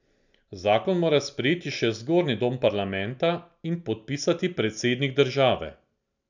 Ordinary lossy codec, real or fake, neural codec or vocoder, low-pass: none; real; none; 7.2 kHz